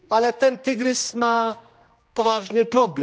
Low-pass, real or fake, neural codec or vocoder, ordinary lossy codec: none; fake; codec, 16 kHz, 1 kbps, X-Codec, HuBERT features, trained on general audio; none